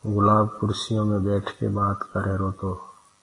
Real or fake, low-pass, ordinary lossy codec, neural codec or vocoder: real; 10.8 kHz; AAC, 32 kbps; none